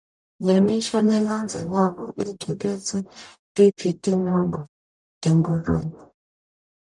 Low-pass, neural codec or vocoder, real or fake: 10.8 kHz; codec, 44.1 kHz, 0.9 kbps, DAC; fake